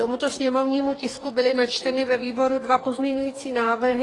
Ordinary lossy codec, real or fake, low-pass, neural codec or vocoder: AAC, 32 kbps; fake; 10.8 kHz; codec, 44.1 kHz, 2.6 kbps, DAC